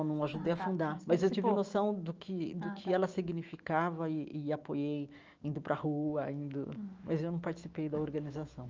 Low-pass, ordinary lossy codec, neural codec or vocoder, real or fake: 7.2 kHz; Opus, 24 kbps; autoencoder, 48 kHz, 128 numbers a frame, DAC-VAE, trained on Japanese speech; fake